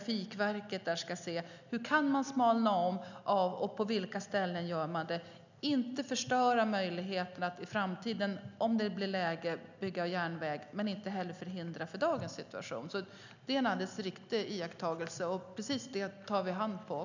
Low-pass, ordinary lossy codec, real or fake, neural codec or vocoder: 7.2 kHz; none; real; none